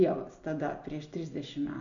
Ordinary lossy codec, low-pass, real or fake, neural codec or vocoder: MP3, 96 kbps; 7.2 kHz; real; none